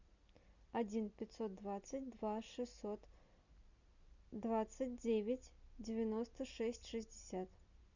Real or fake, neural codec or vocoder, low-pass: real; none; 7.2 kHz